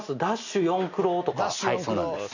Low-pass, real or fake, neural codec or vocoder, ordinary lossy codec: 7.2 kHz; real; none; none